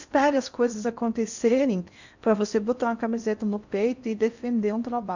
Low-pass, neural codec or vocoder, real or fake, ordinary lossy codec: 7.2 kHz; codec, 16 kHz in and 24 kHz out, 0.6 kbps, FocalCodec, streaming, 4096 codes; fake; none